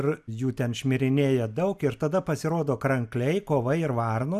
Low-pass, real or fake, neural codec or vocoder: 14.4 kHz; real; none